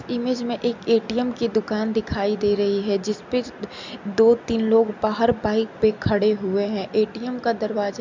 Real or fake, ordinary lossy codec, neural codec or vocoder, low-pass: real; MP3, 64 kbps; none; 7.2 kHz